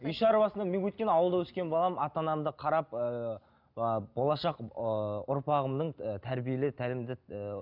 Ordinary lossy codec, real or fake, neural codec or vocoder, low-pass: none; real; none; 5.4 kHz